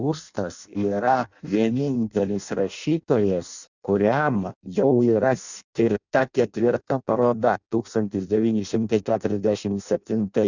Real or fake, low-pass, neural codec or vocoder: fake; 7.2 kHz; codec, 16 kHz in and 24 kHz out, 0.6 kbps, FireRedTTS-2 codec